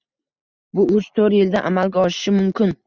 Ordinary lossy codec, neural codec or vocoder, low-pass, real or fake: Opus, 64 kbps; none; 7.2 kHz; real